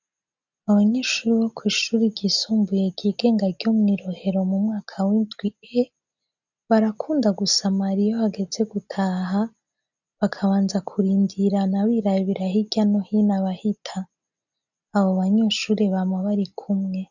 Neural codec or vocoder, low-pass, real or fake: none; 7.2 kHz; real